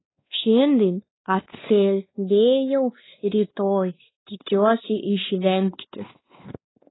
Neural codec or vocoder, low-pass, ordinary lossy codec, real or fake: codec, 16 kHz, 2 kbps, X-Codec, WavLM features, trained on Multilingual LibriSpeech; 7.2 kHz; AAC, 16 kbps; fake